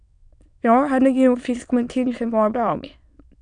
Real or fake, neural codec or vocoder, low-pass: fake; autoencoder, 22.05 kHz, a latent of 192 numbers a frame, VITS, trained on many speakers; 9.9 kHz